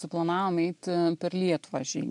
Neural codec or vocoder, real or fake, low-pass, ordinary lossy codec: none; real; 10.8 kHz; MP3, 64 kbps